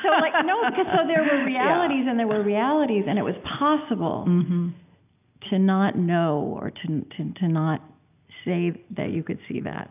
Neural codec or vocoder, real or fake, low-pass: none; real; 3.6 kHz